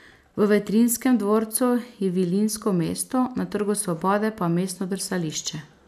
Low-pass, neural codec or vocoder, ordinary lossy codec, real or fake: 14.4 kHz; none; none; real